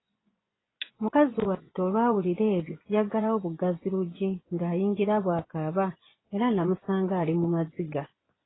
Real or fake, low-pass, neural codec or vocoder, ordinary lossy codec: fake; 7.2 kHz; vocoder, 24 kHz, 100 mel bands, Vocos; AAC, 16 kbps